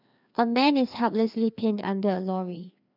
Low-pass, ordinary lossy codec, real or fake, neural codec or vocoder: 5.4 kHz; none; fake; codec, 16 kHz, 2 kbps, FreqCodec, larger model